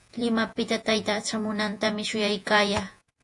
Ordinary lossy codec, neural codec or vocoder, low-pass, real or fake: AAC, 64 kbps; vocoder, 48 kHz, 128 mel bands, Vocos; 10.8 kHz; fake